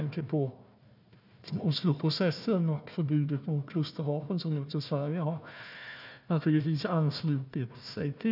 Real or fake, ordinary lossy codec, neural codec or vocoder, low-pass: fake; none; codec, 16 kHz, 1 kbps, FunCodec, trained on Chinese and English, 50 frames a second; 5.4 kHz